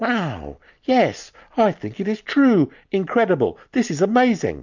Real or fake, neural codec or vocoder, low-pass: real; none; 7.2 kHz